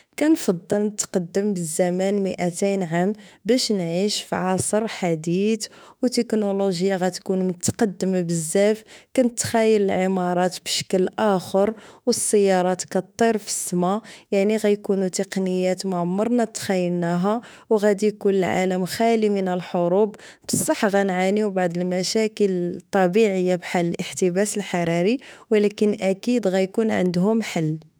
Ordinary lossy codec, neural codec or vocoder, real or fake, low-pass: none; autoencoder, 48 kHz, 32 numbers a frame, DAC-VAE, trained on Japanese speech; fake; none